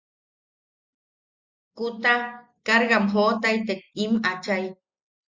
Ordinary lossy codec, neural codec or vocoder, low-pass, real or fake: Opus, 64 kbps; none; 7.2 kHz; real